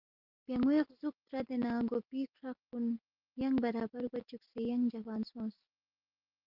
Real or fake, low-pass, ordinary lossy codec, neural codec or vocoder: real; 5.4 kHz; Opus, 32 kbps; none